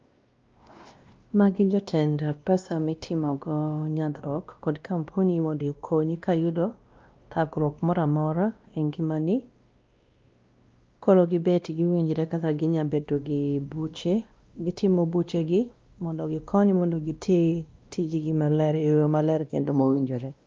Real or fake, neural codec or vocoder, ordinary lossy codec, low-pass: fake; codec, 16 kHz, 1 kbps, X-Codec, WavLM features, trained on Multilingual LibriSpeech; Opus, 24 kbps; 7.2 kHz